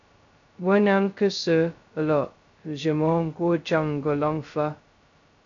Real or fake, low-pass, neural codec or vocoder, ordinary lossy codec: fake; 7.2 kHz; codec, 16 kHz, 0.2 kbps, FocalCodec; AAC, 48 kbps